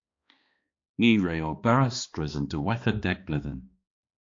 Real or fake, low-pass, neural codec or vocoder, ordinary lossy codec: fake; 7.2 kHz; codec, 16 kHz, 2 kbps, X-Codec, HuBERT features, trained on balanced general audio; AAC, 48 kbps